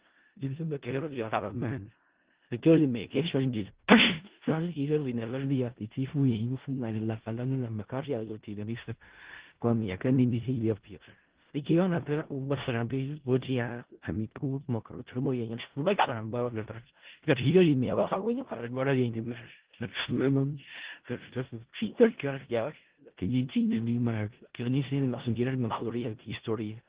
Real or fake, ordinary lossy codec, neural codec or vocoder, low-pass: fake; Opus, 16 kbps; codec, 16 kHz in and 24 kHz out, 0.4 kbps, LongCat-Audio-Codec, four codebook decoder; 3.6 kHz